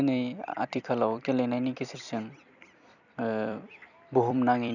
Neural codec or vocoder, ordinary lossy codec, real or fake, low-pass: vocoder, 44.1 kHz, 128 mel bands every 256 samples, BigVGAN v2; none; fake; 7.2 kHz